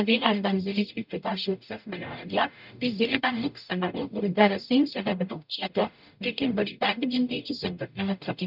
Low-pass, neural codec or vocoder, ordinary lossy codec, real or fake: 5.4 kHz; codec, 44.1 kHz, 0.9 kbps, DAC; none; fake